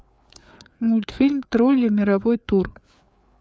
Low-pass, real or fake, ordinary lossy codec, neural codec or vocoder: none; fake; none; codec, 16 kHz, 4 kbps, FreqCodec, larger model